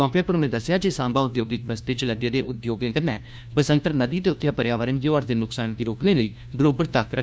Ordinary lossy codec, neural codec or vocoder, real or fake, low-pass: none; codec, 16 kHz, 1 kbps, FunCodec, trained on LibriTTS, 50 frames a second; fake; none